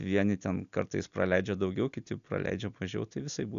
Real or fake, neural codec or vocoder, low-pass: real; none; 7.2 kHz